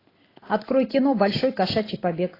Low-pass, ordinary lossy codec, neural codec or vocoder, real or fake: 5.4 kHz; AAC, 24 kbps; none; real